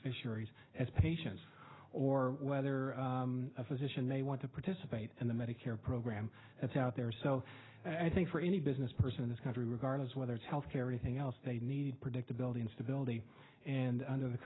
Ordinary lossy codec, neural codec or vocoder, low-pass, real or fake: AAC, 16 kbps; none; 7.2 kHz; real